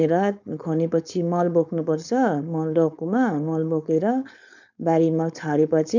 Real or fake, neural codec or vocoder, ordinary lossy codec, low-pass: fake; codec, 16 kHz, 4.8 kbps, FACodec; none; 7.2 kHz